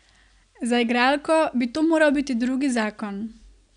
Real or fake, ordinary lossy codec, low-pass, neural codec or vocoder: real; none; 9.9 kHz; none